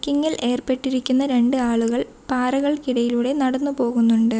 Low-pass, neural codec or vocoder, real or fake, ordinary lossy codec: none; none; real; none